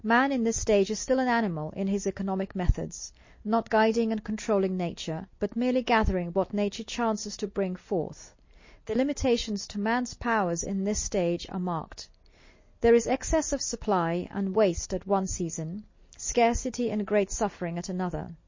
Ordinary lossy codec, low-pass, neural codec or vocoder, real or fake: MP3, 32 kbps; 7.2 kHz; none; real